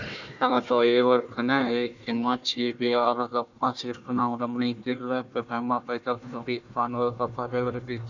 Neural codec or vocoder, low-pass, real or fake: codec, 16 kHz, 1 kbps, FunCodec, trained on Chinese and English, 50 frames a second; 7.2 kHz; fake